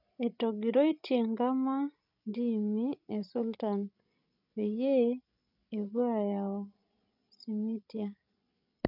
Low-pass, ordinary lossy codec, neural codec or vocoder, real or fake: 5.4 kHz; none; codec, 16 kHz, 16 kbps, FreqCodec, larger model; fake